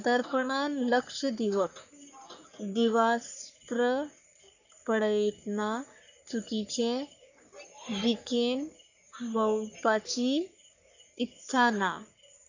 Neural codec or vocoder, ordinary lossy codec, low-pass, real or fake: codec, 44.1 kHz, 3.4 kbps, Pupu-Codec; none; 7.2 kHz; fake